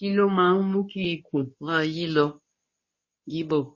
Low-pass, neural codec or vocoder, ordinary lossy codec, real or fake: 7.2 kHz; codec, 24 kHz, 0.9 kbps, WavTokenizer, medium speech release version 2; MP3, 32 kbps; fake